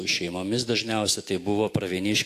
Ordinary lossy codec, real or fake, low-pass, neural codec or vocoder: AAC, 64 kbps; fake; 14.4 kHz; autoencoder, 48 kHz, 128 numbers a frame, DAC-VAE, trained on Japanese speech